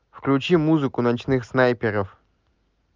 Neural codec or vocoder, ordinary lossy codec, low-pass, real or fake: none; Opus, 32 kbps; 7.2 kHz; real